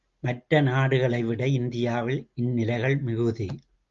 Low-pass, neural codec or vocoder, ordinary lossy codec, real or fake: 7.2 kHz; none; Opus, 32 kbps; real